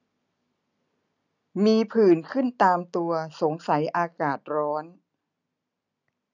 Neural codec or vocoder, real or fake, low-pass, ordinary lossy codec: none; real; 7.2 kHz; none